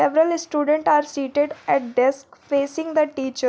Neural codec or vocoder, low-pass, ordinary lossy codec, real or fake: none; none; none; real